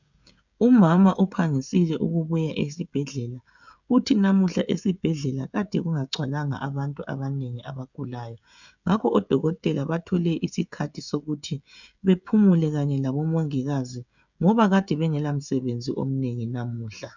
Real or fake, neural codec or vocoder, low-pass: fake; codec, 16 kHz, 16 kbps, FreqCodec, smaller model; 7.2 kHz